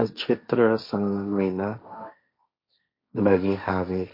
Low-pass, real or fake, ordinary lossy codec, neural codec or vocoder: 5.4 kHz; fake; MP3, 32 kbps; codec, 16 kHz, 1.1 kbps, Voila-Tokenizer